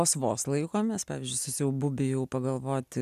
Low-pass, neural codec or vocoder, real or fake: 14.4 kHz; none; real